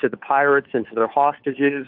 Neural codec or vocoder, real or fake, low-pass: codec, 16 kHz, 2 kbps, FunCodec, trained on Chinese and English, 25 frames a second; fake; 5.4 kHz